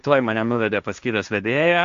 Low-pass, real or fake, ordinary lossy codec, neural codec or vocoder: 7.2 kHz; fake; Opus, 64 kbps; codec, 16 kHz, 1.1 kbps, Voila-Tokenizer